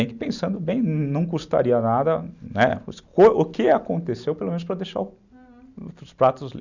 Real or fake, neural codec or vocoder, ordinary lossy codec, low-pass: real; none; none; 7.2 kHz